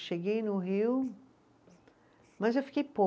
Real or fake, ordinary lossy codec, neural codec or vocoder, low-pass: real; none; none; none